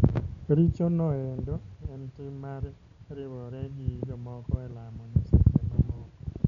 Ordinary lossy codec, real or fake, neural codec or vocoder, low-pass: none; real; none; 7.2 kHz